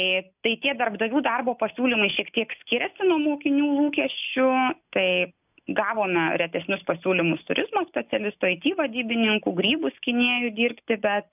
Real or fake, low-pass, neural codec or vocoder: real; 3.6 kHz; none